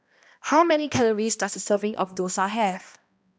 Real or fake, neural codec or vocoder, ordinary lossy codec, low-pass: fake; codec, 16 kHz, 1 kbps, X-Codec, HuBERT features, trained on balanced general audio; none; none